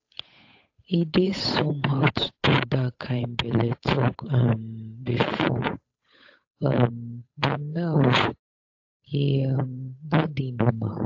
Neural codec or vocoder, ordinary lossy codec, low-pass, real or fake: codec, 16 kHz, 8 kbps, FunCodec, trained on Chinese and English, 25 frames a second; AAC, 48 kbps; 7.2 kHz; fake